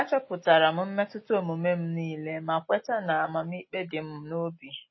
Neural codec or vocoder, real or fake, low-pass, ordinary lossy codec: none; real; 7.2 kHz; MP3, 24 kbps